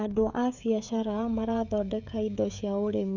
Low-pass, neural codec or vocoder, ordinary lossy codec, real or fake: 7.2 kHz; codec, 16 kHz, 16 kbps, FreqCodec, smaller model; none; fake